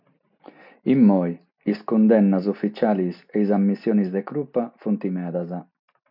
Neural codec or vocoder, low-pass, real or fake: none; 5.4 kHz; real